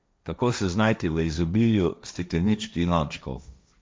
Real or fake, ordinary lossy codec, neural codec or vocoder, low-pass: fake; none; codec, 16 kHz, 1.1 kbps, Voila-Tokenizer; none